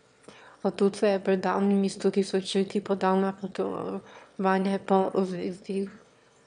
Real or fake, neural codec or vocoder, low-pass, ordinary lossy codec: fake; autoencoder, 22.05 kHz, a latent of 192 numbers a frame, VITS, trained on one speaker; 9.9 kHz; none